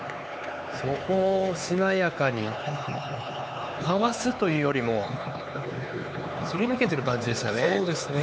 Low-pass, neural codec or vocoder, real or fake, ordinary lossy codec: none; codec, 16 kHz, 4 kbps, X-Codec, HuBERT features, trained on LibriSpeech; fake; none